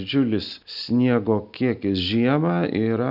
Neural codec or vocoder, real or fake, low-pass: none; real; 5.4 kHz